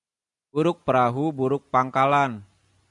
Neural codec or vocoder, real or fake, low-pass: none; real; 10.8 kHz